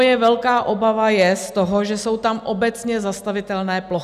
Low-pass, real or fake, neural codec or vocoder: 14.4 kHz; real; none